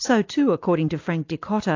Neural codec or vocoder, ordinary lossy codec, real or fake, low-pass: none; AAC, 48 kbps; real; 7.2 kHz